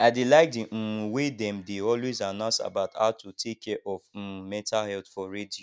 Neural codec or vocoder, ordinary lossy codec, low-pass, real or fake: none; none; none; real